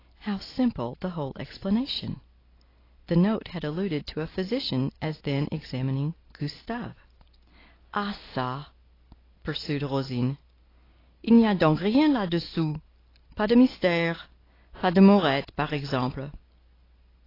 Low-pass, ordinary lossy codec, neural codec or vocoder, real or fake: 5.4 kHz; AAC, 24 kbps; none; real